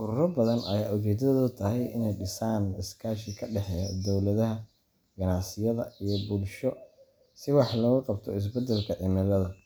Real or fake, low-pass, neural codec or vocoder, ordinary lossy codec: real; none; none; none